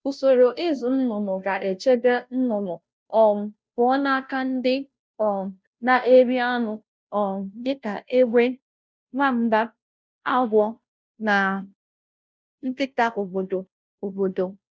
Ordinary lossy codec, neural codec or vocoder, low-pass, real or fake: none; codec, 16 kHz, 0.5 kbps, FunCodec, trained on Chinese and English, 25 frames a second; none; fake